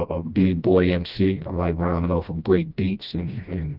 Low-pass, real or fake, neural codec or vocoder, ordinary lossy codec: 5.4 kHz; fake; codec, 16 kHz, 1 kbps, FreqCodec, smaller model; Opus, 24 kbps